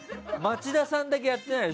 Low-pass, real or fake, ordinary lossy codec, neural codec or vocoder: none; real; none; none